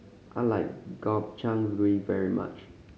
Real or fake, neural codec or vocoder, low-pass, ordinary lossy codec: real; none; none; none